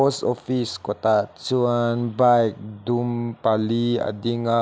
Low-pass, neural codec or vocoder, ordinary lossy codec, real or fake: none; none; none; real